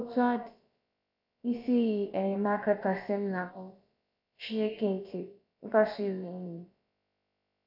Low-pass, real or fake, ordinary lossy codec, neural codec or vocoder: 5.4 kHz; fake; none; codec, 16 kHz, about 1 kbps, DyCAST, with the encoder's durations